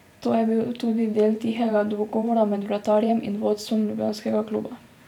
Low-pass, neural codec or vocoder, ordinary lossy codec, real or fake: 19.8 kHz; vocoder, 44.1 kHz, 128 mel bands every 512 samples, BigVGAN v2; none; fake